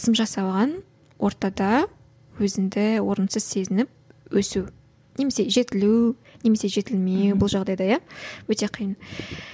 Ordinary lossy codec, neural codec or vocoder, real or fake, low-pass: none; none; real; none